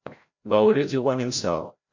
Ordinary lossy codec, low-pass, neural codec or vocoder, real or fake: MP3, 48 kbps; 7.2 kHz; codec, 16 kHz, 0.5 kbps, FreqCodec, larger model; fake